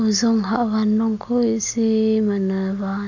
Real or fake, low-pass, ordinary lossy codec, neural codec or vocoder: real; 7.2 kHz; none; none